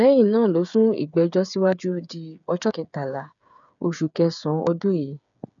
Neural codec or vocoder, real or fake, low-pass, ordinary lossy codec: codec, 16 kHz, 8 kbps, FreqCodec, smaller model; fake; 7.2 kHz; none